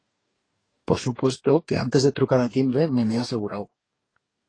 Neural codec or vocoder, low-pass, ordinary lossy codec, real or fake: codec, 44.1 kHz, 2.6 kbps, DAC; 9.9 kHz; AAC, 32 kbps; fake